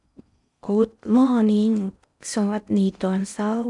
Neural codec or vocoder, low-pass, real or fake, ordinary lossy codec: codec, 16 kHz in and 24 kHz out, 0.6 kbps, FocalCodec, streaming, 4096 codes; 10.8 kHz; fake; none